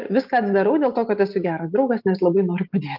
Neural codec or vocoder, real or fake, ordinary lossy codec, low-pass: none; real; Opus, 32 kbps; 5.4 kHz